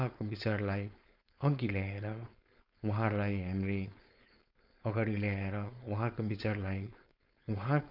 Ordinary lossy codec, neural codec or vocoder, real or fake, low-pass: none; codec, 16 kHz, 4.8 kbps, FACodec; fake; 5.4 kHz